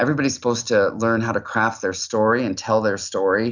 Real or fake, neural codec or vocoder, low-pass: real; none; 7.2 kHz